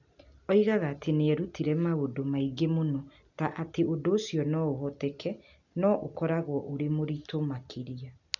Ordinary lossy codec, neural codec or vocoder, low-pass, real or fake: none; none; 7.2 kHz; real